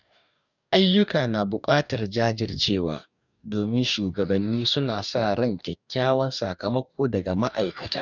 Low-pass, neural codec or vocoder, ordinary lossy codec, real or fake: 7.2 kHz; codec, 44.1 kHz, 2.6 kbps, DAC; none; fake